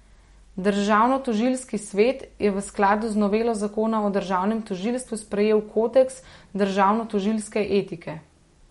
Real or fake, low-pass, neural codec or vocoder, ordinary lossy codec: real; 19.8 kHz; none; MP3, 48 kbps